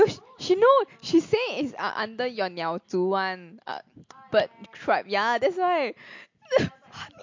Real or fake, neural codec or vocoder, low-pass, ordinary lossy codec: real; none; 7.2 kHz; MP3, 48 kbps